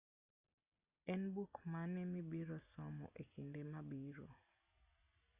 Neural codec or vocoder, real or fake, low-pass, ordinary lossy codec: none; real; 3.6 kHz; Opus, 64 kbps